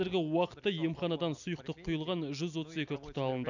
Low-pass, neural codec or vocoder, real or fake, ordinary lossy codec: 7.2 kHz; none; real; none